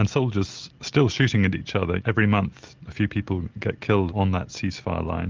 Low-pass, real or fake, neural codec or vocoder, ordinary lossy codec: 7.2 kHz; real; none; Opus, 32 kbps